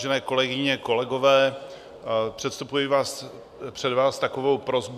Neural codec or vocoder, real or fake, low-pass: none; real; 14.4 kHz